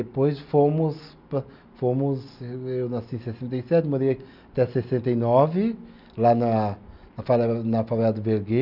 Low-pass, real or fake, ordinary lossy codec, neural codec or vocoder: 5.4 kHz; real; none; none